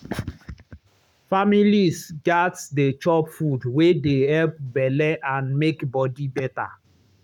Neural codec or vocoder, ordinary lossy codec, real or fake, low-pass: codec, 44.1 kHz, 7.8 kbps, Pupu-Codec; none; fake; 19.8 kHz